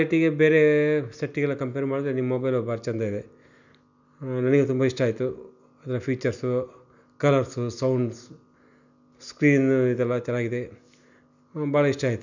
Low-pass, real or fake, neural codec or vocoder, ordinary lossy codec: 7.2 kHz; real; none; none